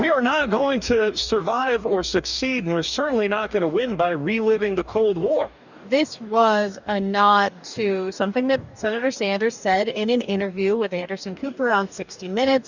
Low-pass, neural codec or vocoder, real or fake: 7.2 kHz; codec, 44.1 kHz, 2.6 kbps, DAC; fake